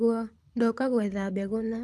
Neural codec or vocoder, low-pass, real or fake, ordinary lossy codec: codec, 24 kHz, 6 kbps, HILCodec; none; fake; none